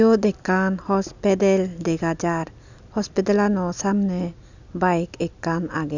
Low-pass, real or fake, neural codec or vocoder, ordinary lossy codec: 7.2 kHz; real; none; none